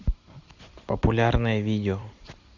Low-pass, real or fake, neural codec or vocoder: 7.2 kHz; fake; vocoder, 44.1 kHz, 128 mel bands every 256 samples, BigVGAN v2